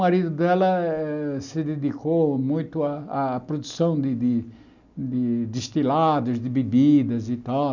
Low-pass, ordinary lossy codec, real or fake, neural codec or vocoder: 7.2 kHz; none; real; none